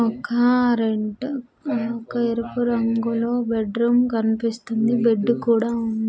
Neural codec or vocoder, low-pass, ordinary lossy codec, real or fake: none; none; none; real